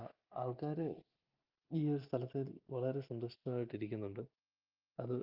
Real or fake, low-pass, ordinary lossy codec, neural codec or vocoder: real; 5.4 kHz; Opus, 32 kbps; none